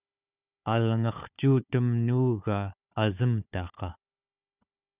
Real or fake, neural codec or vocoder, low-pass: fake; codec, 16 kHz, 4 kbps, FunCodec, trained on Chinese and English, 50 frames a second; 3.6 kHz